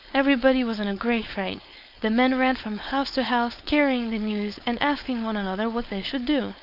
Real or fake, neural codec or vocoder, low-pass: fake; codec, 16 kHz, 4.8 kbps, FACodec; 5.4 kHz